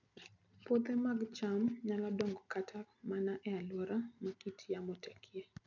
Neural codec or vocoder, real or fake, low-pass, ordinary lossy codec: none; real; 7.2 kHz; none